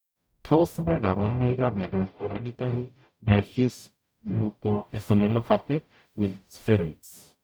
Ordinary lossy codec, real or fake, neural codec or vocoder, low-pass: none; fake; codec, 44.1 kHz, 0.9 kbps, DAC; none